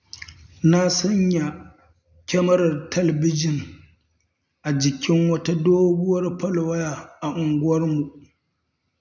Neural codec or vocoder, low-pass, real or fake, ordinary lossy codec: none; 7.2 kHz; real; none